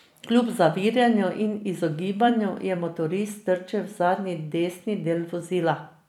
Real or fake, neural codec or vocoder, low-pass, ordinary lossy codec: fake; vocoder, 44.1 kHz, 128 mel bands every 256 samples, BigVGAN v2; 19.8 kHz; none